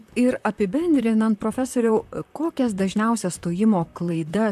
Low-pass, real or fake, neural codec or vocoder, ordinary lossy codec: 14.4 kHz; fake; vocoder, 44.1 kHz, 128 mel bands, Pupu-Vocoder; AAC, 96 kbps